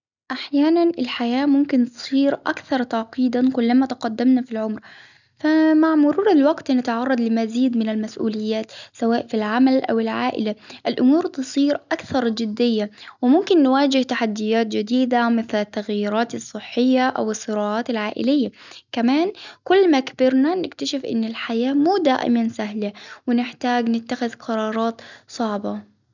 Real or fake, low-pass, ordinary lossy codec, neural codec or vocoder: real; 7.2 kHz; none; none